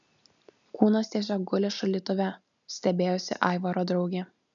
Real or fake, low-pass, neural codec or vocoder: real; 7.2 kHz; none